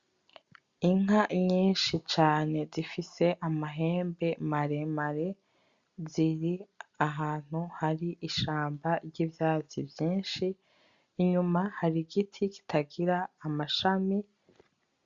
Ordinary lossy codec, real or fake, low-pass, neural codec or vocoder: Opus, 64 kbps; real; 7.2 kHz; none